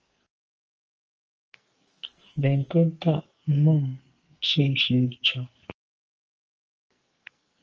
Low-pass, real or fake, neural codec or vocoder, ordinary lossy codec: 7.2 kHz; fake; codec, 44.1 kHz, 2.6 kbps, SNAC; Opus, 24 kbps